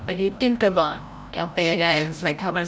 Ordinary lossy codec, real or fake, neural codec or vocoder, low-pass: none; fake; codec, 16 kHz, 0.5 kbps, FreqCodec, larger model; none